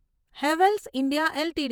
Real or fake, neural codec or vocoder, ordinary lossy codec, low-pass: fake; vocoder, 48 kHz, 128 mel bands, Vocos; none; none